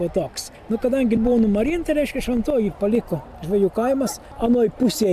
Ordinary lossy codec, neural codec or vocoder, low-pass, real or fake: AAC, 96 kbps; none; 14.4 kHz; real